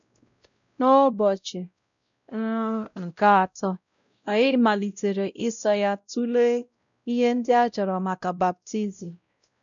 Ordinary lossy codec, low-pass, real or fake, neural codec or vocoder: none; 7.2 kHz; fake; codec, 16 kHz, 0.5 kbps, X-Codec, WavLM features, trained on Multilingual LibriSpeech